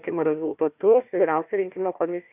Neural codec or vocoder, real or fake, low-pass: codec, 16 kHz, 1 kbps, FunCodec, trained on Chinese and English, 50 frames a second; fake; 3.6 kHz